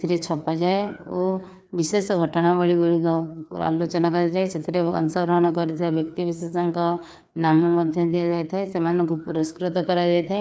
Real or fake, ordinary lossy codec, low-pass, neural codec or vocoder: fake; none; none; codec, 16 kHz, 2 kbps, FreqCodec, larger model